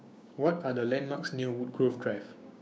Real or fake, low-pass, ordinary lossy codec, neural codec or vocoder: fake; none; none; codec, 16 kHz, 6 kbps, DAC